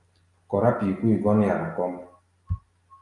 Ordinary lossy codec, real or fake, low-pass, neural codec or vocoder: Opus, 32 kbps; real; 10.8 kHz; none